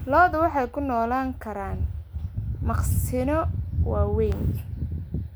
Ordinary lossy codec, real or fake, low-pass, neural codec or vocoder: none; real; none; none